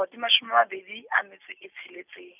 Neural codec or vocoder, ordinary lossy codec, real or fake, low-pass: none; none; real; 3.6 kHz